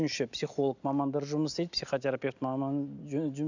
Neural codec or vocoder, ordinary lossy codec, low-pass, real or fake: none; none; 7.2 kHz; real